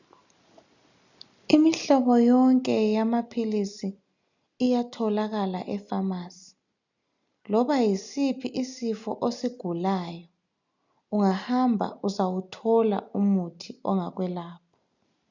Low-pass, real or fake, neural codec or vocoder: 7.2 kHz; real; none